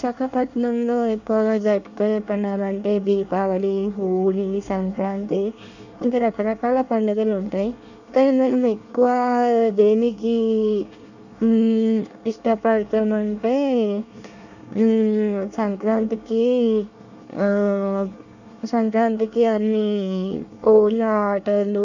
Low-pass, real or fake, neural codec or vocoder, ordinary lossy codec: 7.2 kHz; fake; codec, 24 kHz, 1 kbps, SNAC; none